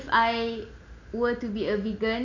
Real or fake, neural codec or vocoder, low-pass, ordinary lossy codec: real; none; 7.2 kHz; MP3, 64 kbps